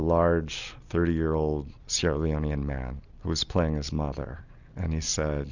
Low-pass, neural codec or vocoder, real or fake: 7.2 kHz; none; real